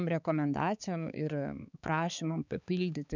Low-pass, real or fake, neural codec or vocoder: 7.2 kHz; fake; codec, 16 kHz, 4 kbps, X-Codec, HuBERT features, trained on balanced general audio